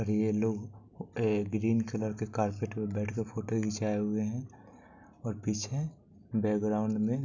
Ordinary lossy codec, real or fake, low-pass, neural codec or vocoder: none; real; 7.2 kHz; none